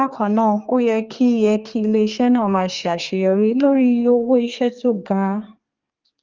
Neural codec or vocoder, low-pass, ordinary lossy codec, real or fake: codec, 32 kHz, 1.9 kbps, SNAC; 7.2 kHz; Opus, 32 kbps; fake